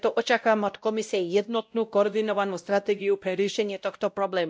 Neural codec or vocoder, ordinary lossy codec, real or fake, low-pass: codec, 16 kHz, 0.5 kbps, X-Codec, WavLM features, trained on Multilingual LibriSpeech; none; fake; none